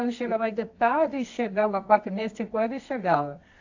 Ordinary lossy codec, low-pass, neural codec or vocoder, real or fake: AAC, 48 kbps; 7.2 kHz; codec, 24 kHz, 0.9 kbps, WavTokenizer, medium music audio release; fake